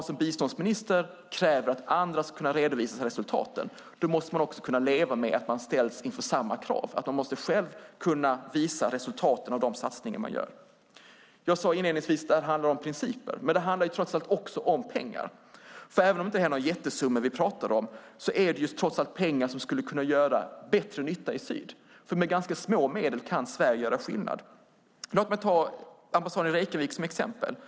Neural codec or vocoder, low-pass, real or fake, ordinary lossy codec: none; none; real; none